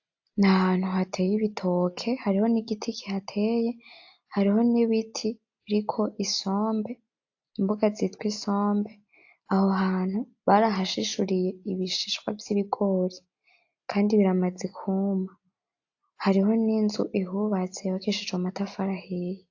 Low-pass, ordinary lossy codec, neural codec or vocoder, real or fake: 7.2 kHz; AAC, 48 kbps; none; real